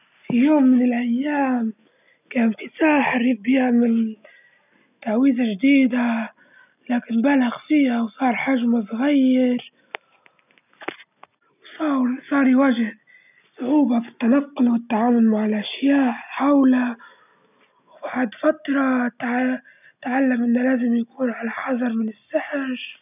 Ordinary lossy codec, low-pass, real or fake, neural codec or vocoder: none; 3.6 kHz; real; none